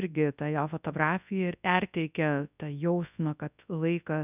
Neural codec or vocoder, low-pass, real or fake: codec, 24 kHz, 0.9 kbps, WavTokenizer, large speech release; 3.6 kHz; fake